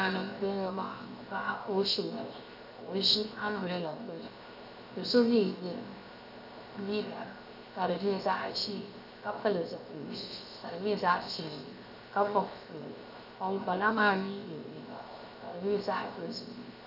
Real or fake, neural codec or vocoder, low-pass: fake; codec, 16 kHz, 0.7 kbps, FocalCodec; 5.4 kHz